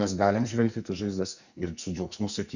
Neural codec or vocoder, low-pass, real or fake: codec, 44.1 kHz, 2.6 kbps, SNAC; 7.2 kHz; fake